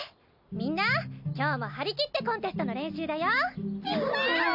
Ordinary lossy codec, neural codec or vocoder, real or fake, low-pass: none; none; real; 5.4 kHz